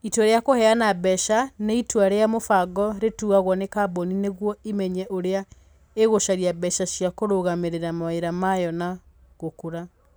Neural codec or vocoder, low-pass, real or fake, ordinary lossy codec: none; none; real; none